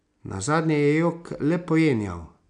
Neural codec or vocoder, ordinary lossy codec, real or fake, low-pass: none; none; real; 9.9 kHz